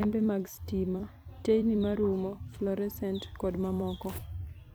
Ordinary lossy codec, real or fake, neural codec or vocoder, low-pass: none; fake; vocoder, 44.1 kHz, 128 mel bands every 256 samples, BigVGAN v2; none